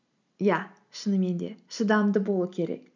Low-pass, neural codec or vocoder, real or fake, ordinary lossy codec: 7.2 kHz; none; real; none